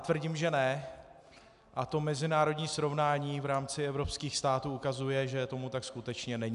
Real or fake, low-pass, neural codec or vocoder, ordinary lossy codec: real; 10.8 kHz; none; MP3, 96 kbps